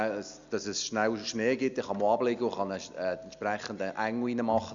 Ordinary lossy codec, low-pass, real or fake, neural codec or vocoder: Opus, 64 kbps; 7.2 kHz; real; none